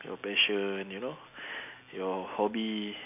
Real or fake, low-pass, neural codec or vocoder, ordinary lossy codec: real; 3.6 kHz; none; none